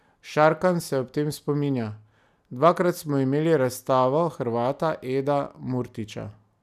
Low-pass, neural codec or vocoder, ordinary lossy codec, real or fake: 14.4 kHz; none; none; real